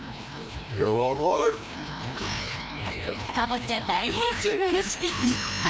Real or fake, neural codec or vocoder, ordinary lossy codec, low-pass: fake; codec, 16 kHz, 1 kbps, FreqCodec, larger model; none; none